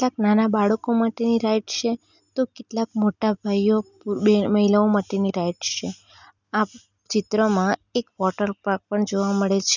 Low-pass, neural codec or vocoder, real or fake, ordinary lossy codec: 7.2 kHz; none; real; none